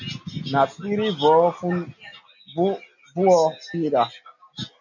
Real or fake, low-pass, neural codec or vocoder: real; 7.2 kHz; none